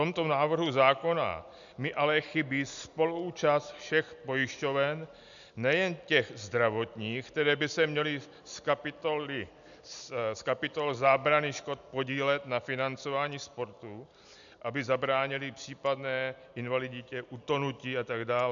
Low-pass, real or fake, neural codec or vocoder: 7.2 kHz; real; none